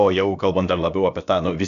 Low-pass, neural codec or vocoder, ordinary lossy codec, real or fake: 7.2 kHz; codec, 16 kHz, about 1 kbps, DyCAST, with the encoder's durations; Opus, 64 kbps; fake